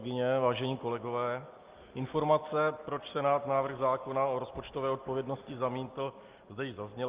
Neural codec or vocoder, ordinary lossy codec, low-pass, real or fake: none; Opus, 32 kbps; 3.6 kHz; real